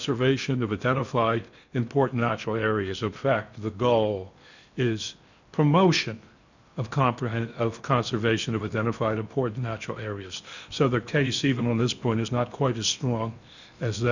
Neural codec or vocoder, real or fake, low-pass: codec, 16 kHz in and 24 kHz out, 0.8 kbps, FocalCodec, streaming, 65536 codes; fake; 7.2 kHz